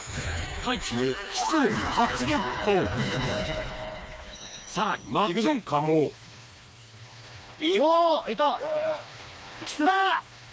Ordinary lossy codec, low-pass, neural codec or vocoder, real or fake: none; none; codec, 16 kHz, 2 kbps, FreqCodec, smaller model; fake